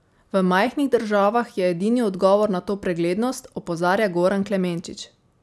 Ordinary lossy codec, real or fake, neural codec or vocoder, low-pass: none; real; none; none